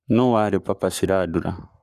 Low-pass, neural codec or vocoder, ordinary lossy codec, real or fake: 14.4 kHz; codec, 44.1 kHz, 7.8 kbps, Pupu-Codec; none; fake